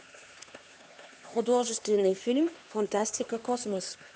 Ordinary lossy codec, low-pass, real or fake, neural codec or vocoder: none; none; fake; codec, 16 kHz, 2 kbps, X-Codec, HuBERT features, trained on LibriSpeech